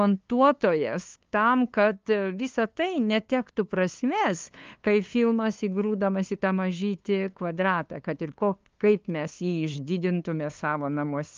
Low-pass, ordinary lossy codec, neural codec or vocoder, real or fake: 7.2 kHz; Opus, 24 kbps; codec, 16 kHz, 2 kbps, FunCodec, trained on LibriTTS, 25 frames a second; fake